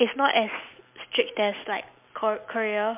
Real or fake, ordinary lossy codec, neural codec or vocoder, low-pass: real; MP3, 32 kbps; none; 3.6 kHz